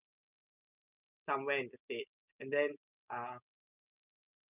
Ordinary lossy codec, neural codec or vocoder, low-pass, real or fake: none; none; 3.6 kHz; real